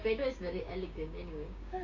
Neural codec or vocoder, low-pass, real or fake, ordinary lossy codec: codec, 16 kHz, 6 kbps, DAC; 7.2 kHz; fake; none